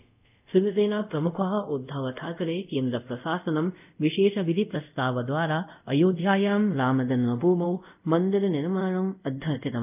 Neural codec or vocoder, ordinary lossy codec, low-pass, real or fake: codec, 24 kHz, 0.5 kbps, DualCodec; none; 3.6 kHz; fake